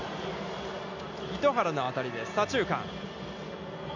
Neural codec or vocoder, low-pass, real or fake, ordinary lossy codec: none; 7.2 kHz; real; MP3, 64 kbps